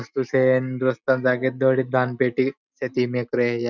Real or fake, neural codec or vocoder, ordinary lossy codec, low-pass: real; none; none; 7.2 kHz